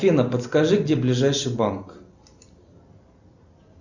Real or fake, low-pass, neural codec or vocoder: real; 7.2 kHz; none